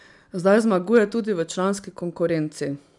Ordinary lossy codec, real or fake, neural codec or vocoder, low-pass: none; real; none; 10.8 kHz